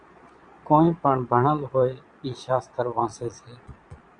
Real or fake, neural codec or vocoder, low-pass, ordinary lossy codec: fake; vocoder, 22.05 kHz, 80 mel bands, Vocos; 9.9 kHz; Opus, 64 kbps